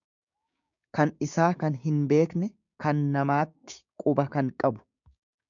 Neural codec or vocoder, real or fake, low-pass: codec, 16 kHz, 6 kbps, DAC; fake; 7.2 kHz